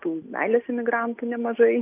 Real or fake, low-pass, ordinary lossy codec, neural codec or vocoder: real; 3.6 kHz; AAC, 32 kbps; none